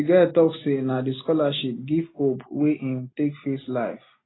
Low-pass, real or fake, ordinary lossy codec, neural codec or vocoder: 7.2 kHz; real; AAC, 16 kbps; none